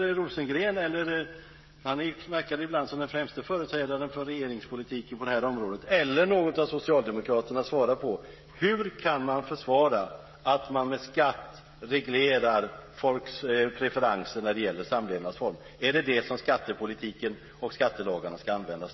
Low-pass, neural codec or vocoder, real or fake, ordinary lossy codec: 7.2 kHz; codec, 16 kHz, 16 kbps, FreqCodec, smaller model; fake; MP3, 24 kbps